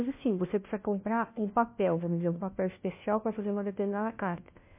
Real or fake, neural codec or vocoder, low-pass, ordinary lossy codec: fake; codec, 16 kHz, 1 kbps, FunCodec, trained on LibriTTS, 50 frames a second; 3.6 kHz; MP3, 24 kbps